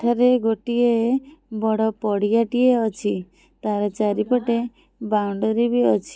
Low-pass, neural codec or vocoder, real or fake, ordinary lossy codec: none; none; real; none